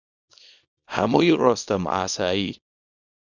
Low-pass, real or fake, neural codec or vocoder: 7.2 kHz; fake; codec, 24 kHz, 0.9 kbps, WavTokenizer, small release